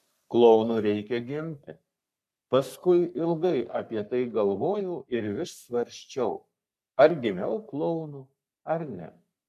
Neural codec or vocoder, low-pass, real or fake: codec, 44.1 kHz, 3.4 kbps, Pupu-Codec; 14.4 kHz; fake